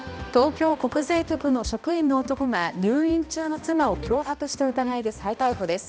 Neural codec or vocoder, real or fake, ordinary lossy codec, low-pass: codec, 16 kHz, 1 kbps, X-Codec, HuBERT features, trained on balanced general audio; fake; none; none